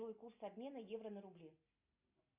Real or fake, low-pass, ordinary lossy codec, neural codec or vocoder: real; 3.6 kHz; MP3, 32 kbps; none